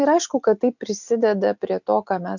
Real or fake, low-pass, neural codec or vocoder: real; 7.2 kHz; none